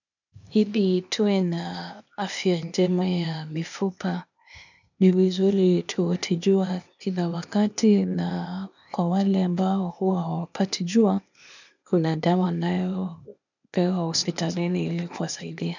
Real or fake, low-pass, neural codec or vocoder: fake; 7.2 kHz; codec, 16 kHz, 0.8 kbps, ZipCodec